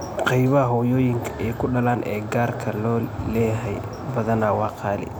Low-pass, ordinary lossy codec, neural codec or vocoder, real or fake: none; none; none; real